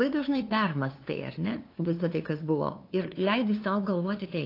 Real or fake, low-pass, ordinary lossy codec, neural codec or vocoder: fake; 5.4 kHz; AAC, 32 kbps; codec, 16 kHz, 2 kbps, FunCodec, trained on LibriTTS, 25 frames a second